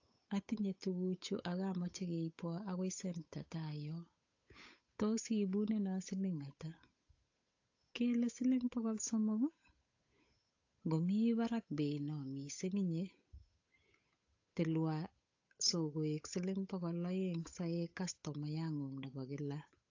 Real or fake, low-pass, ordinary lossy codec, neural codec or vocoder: fake; 7.2 kHz; AAC, 48 kbps; codec, 16 kHz, 8 kbps, FunCodec, trained on Chinese and English, 25 frames a second